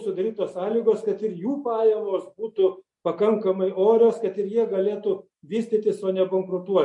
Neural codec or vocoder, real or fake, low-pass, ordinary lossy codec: none; real; 10.8 kHz; MP3, 48 kbps